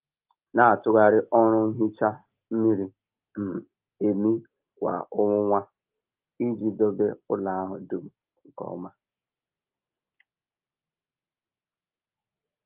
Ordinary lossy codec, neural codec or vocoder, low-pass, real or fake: Opus, 32 kbps; none; 3.6 kHz; real